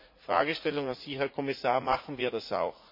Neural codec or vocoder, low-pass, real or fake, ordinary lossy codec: vocoder, 44.1 kHz, 80 mel bands, Vocos; 5.4 kHz; fake; MP3, 32 kbps